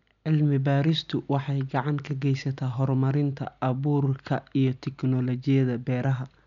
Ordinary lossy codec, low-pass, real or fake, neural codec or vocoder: none; 7.2 kHz; real; none